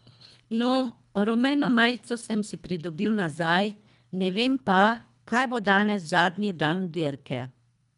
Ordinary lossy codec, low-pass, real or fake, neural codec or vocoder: none; 10.8 kHz; fake; codec, 24 kHz, 1.5 kbps, HILCodec